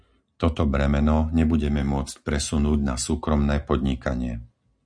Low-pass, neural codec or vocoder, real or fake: 9.9 kHz; none; real